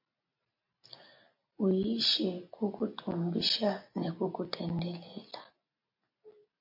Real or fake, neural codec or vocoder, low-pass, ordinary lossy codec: real; none; 5.4 kHz; MP3, 32 kbps